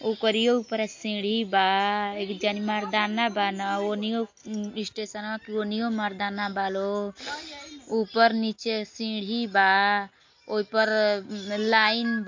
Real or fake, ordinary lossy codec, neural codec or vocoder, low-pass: real; MP3, 48 kbps; none; 7.2 kHz